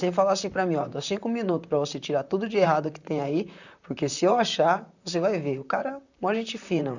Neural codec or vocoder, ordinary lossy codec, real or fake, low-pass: vocoder, 44.1 kHz, 128 mel bands, Pupu-Vocoder; none; fake; 7.2 kHz